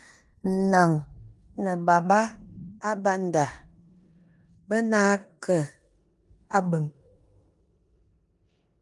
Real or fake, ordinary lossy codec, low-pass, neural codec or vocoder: fake; Opus, 32 kbps; 10.8 kHz; codec, 16 kHz in and 24 kHz out, 0.9 kbps, LongCat-Audio-Codec, four codebook decoder